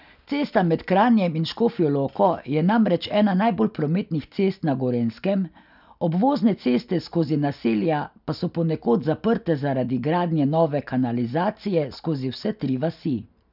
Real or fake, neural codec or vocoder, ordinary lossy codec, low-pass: real; none; none; 5.4 kHz